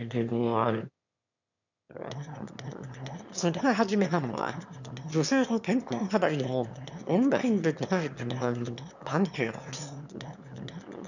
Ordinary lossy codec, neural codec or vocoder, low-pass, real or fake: none; autoencoder, 22.05 kHz, a latent of 192 numbers a frame, VITS, trained on one speaker; 7.2 kHz; fake